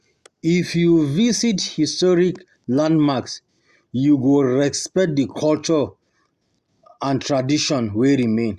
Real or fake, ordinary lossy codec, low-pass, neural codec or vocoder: real; Opus, 64 kbps; 14.4 kHz; none